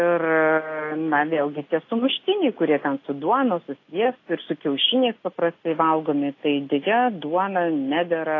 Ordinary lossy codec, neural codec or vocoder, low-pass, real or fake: AAC, 32 kbps; none; 7.2 kHz; real